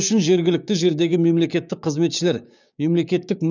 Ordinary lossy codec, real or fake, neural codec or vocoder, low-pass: none; fake; codec, 16 kHz, 6 kbps, DAC; 7.2 kHz